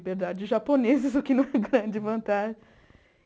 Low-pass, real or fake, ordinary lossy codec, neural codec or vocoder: none; real; none; none